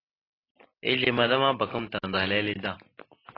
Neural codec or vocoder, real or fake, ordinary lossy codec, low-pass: none; real; AAC, 24 kbps; 5.4 kHz